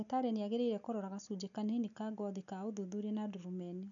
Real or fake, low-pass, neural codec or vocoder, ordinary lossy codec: real; 7.2 kHz; none; none